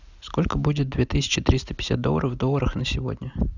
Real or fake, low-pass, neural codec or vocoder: real; 7.2 kHz; none